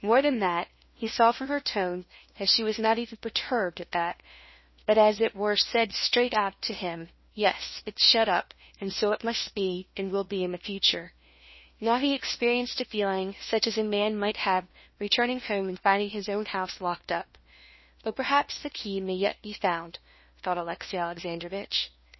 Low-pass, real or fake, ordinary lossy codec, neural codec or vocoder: 7.2 kHz; fake; MP3, 24 kbps; codec, 16 kHz, 1 kbps, FunCodec, trained on LibriTTS, 50 frames a second